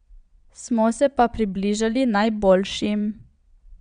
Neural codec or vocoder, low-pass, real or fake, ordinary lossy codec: vocoder, 22.05 kHz, 80 mel bands, Vocos; 9.9 kHz; fake; none